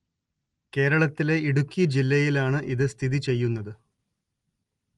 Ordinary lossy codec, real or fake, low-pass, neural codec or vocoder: Opus, 24 kbps; real; 10.8 kHz; none